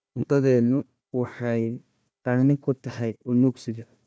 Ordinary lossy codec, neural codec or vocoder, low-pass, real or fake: none; codec, 16 kHz, 1 kbps, FunCodec, trained on Chinese and English, 50 frames a second; none; fake